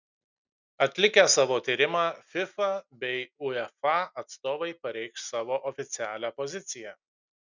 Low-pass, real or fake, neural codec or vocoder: 7.2 kHz; real; none